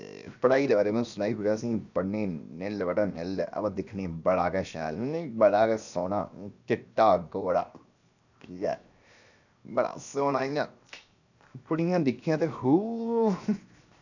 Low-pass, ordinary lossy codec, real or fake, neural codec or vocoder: 7.2 kHz; none; fake; codec, 16 kHz, 0.7 kbps, FocalCodec